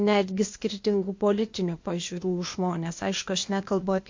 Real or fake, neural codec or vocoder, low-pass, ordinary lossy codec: fake; codec, 16 kHz, 0.8 kbps, ZipCodec; 7.2 kHz; MP3, 48 kbps